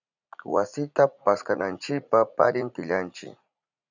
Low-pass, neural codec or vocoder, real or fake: 7.2 kHz; vocoder, 44.1 kHz, 80 mel bands, Vocos; fake